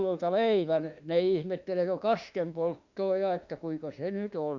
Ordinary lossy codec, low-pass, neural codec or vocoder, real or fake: Opus, 64 kbps; 7.2 kHz; autoencoder, 48 kHz, 32 numbers a frame, DAC-VAE, trained on Japanese speech; fake